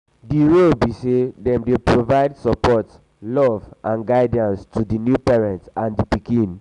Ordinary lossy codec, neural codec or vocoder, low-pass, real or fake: none; none; 10.8 kHz; real